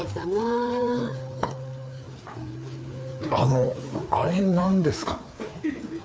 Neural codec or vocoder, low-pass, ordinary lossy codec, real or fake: codec, 16 kHz, 4 kbps, FreqCodec, larger model; none; none; fake